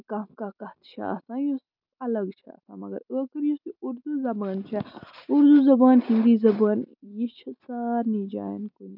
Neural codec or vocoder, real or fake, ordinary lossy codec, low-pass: none; real; none; 5.4 kHz